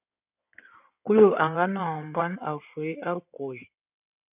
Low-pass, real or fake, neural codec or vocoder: 3.6 kHz; fake; codec, 16 kHz in and 24 kHz out, 2.2 kbps, FireRedTTS-2 codec